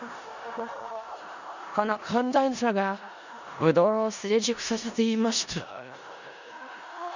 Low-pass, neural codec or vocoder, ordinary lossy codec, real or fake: 7.2 kHz; codec, 16 kHz in and 24 kHz out, 0.4 kbps, LongCat-Audio-Codec, four codebook decoder; none; fake